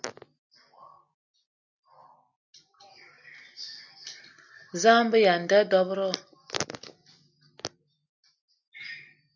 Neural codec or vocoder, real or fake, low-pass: none; real; 7.2 kHz